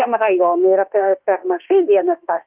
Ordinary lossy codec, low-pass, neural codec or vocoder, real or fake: Opus, 32 kbps; 3.6 kHz; autoencoder, 48 kHz, 32 numbers a frame, DAC-VAE, trained on Japanese speech; fake